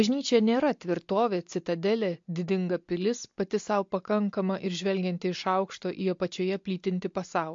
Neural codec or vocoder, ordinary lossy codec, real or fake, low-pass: none; MP3, 48 kbps; real; 7.2 kHz